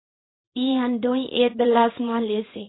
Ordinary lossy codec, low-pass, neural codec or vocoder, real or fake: AAC, 16 kbps; 7.2 kHz; codec, 24 kHz, 0.9 kbps, WavTokenizer, small release; fake